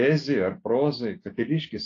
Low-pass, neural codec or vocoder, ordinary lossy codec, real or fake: 7.2 kHz; none; AAC, 32 kbps; real